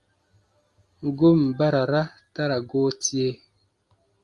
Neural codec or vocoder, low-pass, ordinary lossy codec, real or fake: none; 10.8 kHz; Opus, 32 kbps; real